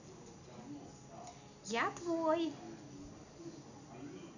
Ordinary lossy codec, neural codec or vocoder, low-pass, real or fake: none; none; 7.2 kHz; real